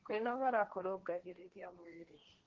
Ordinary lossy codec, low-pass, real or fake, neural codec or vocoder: Opus, 16 kbps; 7.2 kHz; fake; codec, 16 kHz, 1.1 kbps, Voila-Tokenizer